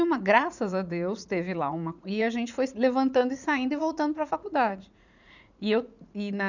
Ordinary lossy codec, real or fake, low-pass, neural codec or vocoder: none; fake; 7.2 kHz; vocoder, 44.1 kHz, 80 mel bands, Vocos